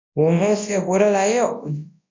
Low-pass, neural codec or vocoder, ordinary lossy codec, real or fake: 7.2 kHz; codec, 24 kHz, 0.9 kbps, WavTokenizer, large speech release; MP3, 48 kbps; fake